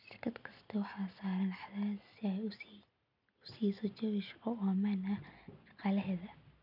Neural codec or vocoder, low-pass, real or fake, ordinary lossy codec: none; 5.4 kHz; real; none